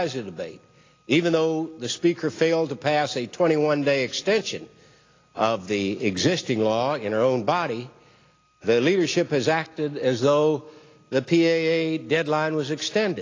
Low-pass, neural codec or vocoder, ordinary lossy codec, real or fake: 7.2 kHz; none; AAC, 32 kbps; real